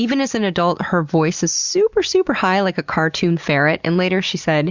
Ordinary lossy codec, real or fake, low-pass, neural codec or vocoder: Opus, 64 kbps; real; 7.2 kHz; none